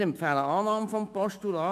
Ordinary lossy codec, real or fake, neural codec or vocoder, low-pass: MP3, 96 kbps; fake; autoencoder, 48 kHz, 128 numbers a frame, DAC-VAE, trained on Japanese speech; 14.4 kHz